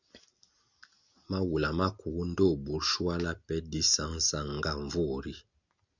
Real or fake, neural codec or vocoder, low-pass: real; none; 7.2 kHz